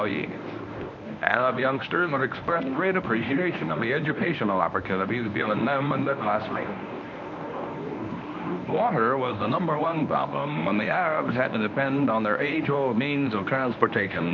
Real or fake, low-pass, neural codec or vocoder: fake; 7.2 kHz; codec, 24 kHz, 0.9 kbps, WavTokenizer, medium speech release version 1